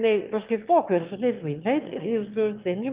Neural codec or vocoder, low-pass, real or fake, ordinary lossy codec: autoencoder, 22.05 kHz, a latent of 192 numbers a frame, VITS, trained on one speaker; 3.6 kHz; fake; Opus, 32 kbps